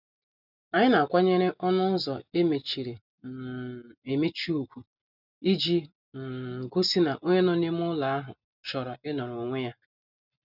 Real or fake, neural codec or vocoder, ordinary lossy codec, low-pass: real; none; none; 5.4 kHz